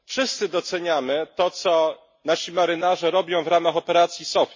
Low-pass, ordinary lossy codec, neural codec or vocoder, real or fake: 7.2 kHz; MP3, 32 kbps; none; real